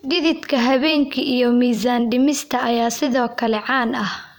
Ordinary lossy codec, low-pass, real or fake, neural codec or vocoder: none; none; fake; vocoder, 44.1 kHz, 128 mel bands every 256 samples, BigVGAN v2